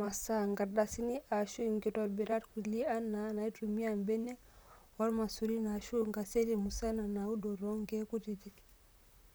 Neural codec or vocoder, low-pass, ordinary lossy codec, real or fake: vocoder, 44.1 kHz, 128 mel bands, Pupu-Vocoder; none; none; fake